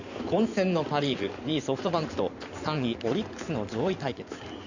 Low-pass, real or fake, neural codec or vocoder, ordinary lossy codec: 7.2 kHz; fake; codec, 16 kHz in and 24 kHz out, 2.2 kbps, FireRedTTS-2 codec; none